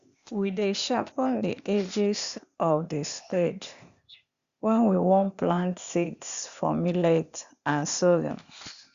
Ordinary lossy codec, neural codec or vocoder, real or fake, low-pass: Opus, 64 kbps; codec, 16 kHz, 0.8 kbps, ZipCodec; fake; 7.2 kHz